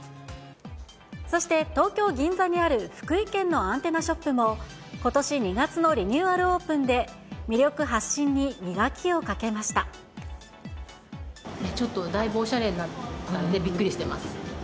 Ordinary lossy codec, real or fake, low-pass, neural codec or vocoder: none; real; none; none